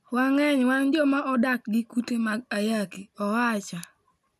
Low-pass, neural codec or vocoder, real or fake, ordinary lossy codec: 14.4 kHz; none; real; none